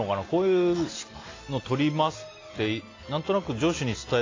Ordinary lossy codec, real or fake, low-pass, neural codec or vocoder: AAC, 32 kbps; real; 7.2 kHz; none